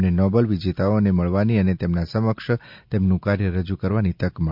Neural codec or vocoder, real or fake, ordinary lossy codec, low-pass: none; real; MP3, 48 kbps; 5.4 kHz